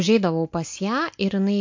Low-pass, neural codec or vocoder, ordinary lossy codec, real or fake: 7.2 kHz; none; MP3, 48 kbps; real